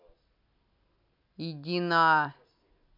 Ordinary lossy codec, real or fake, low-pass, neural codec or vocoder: none; real; 5.4 kHz; none